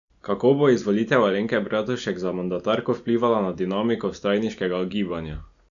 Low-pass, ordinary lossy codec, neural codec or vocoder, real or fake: 7.2 kHz; none; none; real